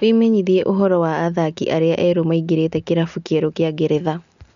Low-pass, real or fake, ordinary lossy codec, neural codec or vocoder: 7.2 kHz; real; none; none